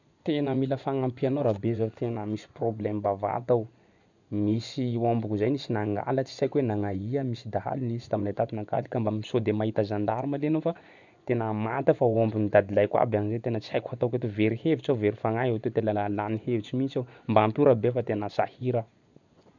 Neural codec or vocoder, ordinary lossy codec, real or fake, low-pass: vocoder, 24 kHz, 100 mel bands, Vocos; none; fake; 7.2 kHz